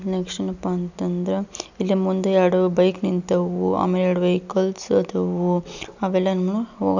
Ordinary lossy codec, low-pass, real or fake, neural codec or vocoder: none; 7.2 kHz; real; none